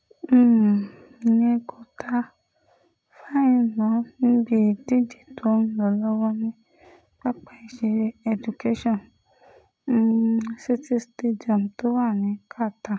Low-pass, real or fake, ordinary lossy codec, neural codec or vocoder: none; real; none; none